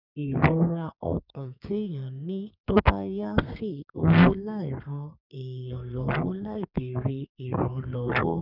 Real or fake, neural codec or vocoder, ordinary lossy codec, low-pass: fake; codec, 32 kHz, 1.9 kbps, SNAC; none; 5.4 kHz